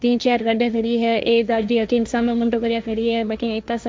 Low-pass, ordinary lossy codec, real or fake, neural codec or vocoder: none; none; fake; codec, 16 kHz, 1.1 kbps, Voila-Tokenizer